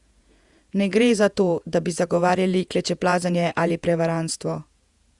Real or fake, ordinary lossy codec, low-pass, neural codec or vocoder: fake; Opus, 64 kbps; 10.8 kHz; vocoder, 48 kHz, 128 mel bands, Vocos